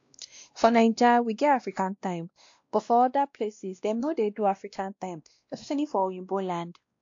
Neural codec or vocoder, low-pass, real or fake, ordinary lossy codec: codec, 16 kHz, 1 kbps, X-Codec, WavLM features, trained on Multilingual LibriSpeech; 7.2 kHz; fake; AAC, 48 kbps